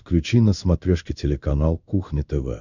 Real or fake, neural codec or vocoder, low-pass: fake; codec, 16 kHz in and 24 kHz out, 1 kbps, XY-Tokenizer; 7.2 kHz